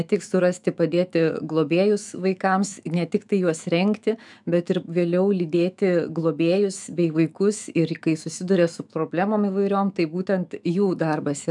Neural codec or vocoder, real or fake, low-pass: autoencoder, 48 kHz, 128 numbers a frame, DAC-VAE, trained on Japanese speech; fake; 10.8 kHz